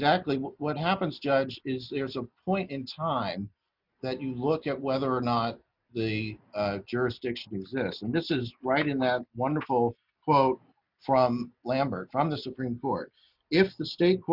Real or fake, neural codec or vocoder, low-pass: real; none; 5.4 kHz